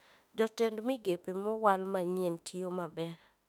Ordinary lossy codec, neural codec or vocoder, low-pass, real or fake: none; autoencoder, 48 kHz, 32 numbers a frame, DAC-VAE, trained on Japanese speech; 19.8 kHz; fake